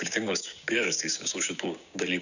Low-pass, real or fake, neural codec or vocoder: 7.2 kHz; fake; codec, 16 kHz, 6 kbps, DAC